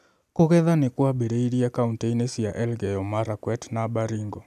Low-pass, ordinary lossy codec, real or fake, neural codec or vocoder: 14.4 kHz; none; real; none